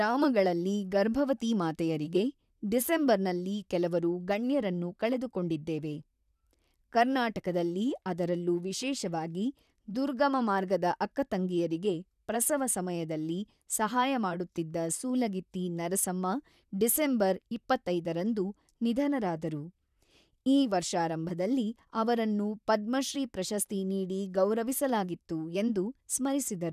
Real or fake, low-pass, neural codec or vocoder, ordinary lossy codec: fake; 14.4 kHz; vocoder, 44.1 kHz, 128 mel bands, Pupu-Vocoder; none